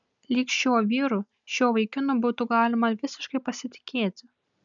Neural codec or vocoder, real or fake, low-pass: none; real; 7.2 kHz